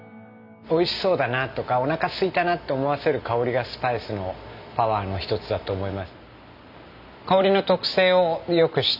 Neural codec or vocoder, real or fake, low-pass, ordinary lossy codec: none; real; 5.4 kHz; none